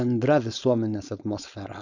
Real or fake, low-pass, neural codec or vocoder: fake; 7.2 kHz; codec, 16 kHz, 4.8 kbps, FACodec